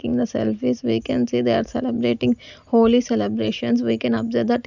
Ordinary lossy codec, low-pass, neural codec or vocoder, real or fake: none; 7.2 kHz; none; real